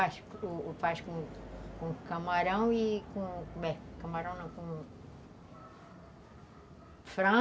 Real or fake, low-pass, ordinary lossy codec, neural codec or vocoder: real; none; none; none